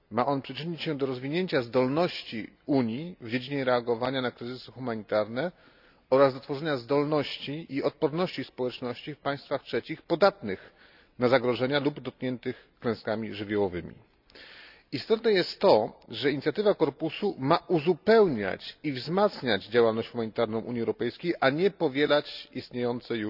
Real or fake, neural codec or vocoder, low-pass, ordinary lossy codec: real; none; 5.4 kHz; none